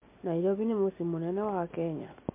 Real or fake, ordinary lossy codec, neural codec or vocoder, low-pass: real; MP3, 24 kbps; none; 3.6 kHz